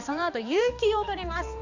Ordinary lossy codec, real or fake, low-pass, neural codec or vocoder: Opus, 64 kbps; fake; 7.2 kHz; codec, 16 kHz, 2 kbps, X-Codec, HuBERT features, trained on balanced general audio